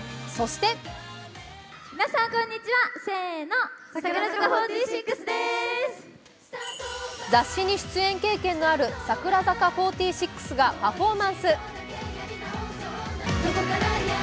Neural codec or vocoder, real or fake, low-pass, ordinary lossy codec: none; real; none; none